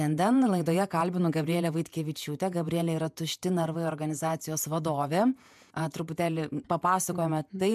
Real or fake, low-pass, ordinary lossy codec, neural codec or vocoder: fake; 14.4 kHz; MP3, 96 kbps; vocoder, 48 kHz, 128 mel bands, Vocos